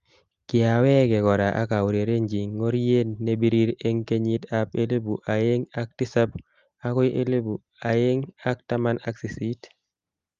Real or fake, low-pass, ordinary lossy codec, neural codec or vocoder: real; 7.2 kHz; Opus, 32 kbps; none